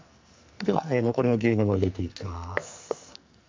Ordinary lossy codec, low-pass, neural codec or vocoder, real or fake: MP3, 64 kbps; 7.2 kHz; codec, 44.1 kHz, 2.6 kbps, SNAC; fake